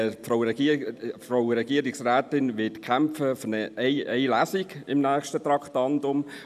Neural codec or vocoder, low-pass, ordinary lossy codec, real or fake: none; 14.4 kHz; none; real